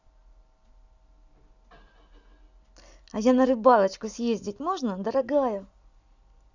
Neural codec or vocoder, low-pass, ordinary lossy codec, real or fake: none; 7.2 kHz; none; real